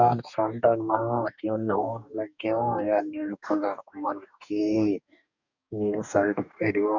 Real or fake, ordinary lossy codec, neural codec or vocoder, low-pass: fake; none; codec, 44.1 kHz, 2.6 kbps, DAC; 7.2 kHz